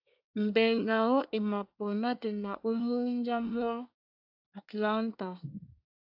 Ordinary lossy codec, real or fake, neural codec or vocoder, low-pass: AAC, 48 kbps; fake; codec, 24 kHz, 1 kbps, SNAC; 5.4 kHz